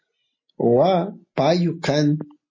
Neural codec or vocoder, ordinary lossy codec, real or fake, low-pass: none; MP3, 32 kbps; real; 7.2 kHz